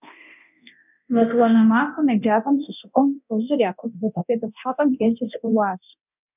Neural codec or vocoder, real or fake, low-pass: codec, 24 kHz, 0.9 kbps, DualCodec; fake; 3.6 kHz